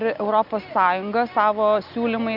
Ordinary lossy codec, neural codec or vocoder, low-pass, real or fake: Opus, 64 kbps; none; 5.4 kHz; real